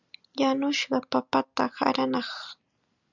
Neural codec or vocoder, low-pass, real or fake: none; 7.2 kHz; real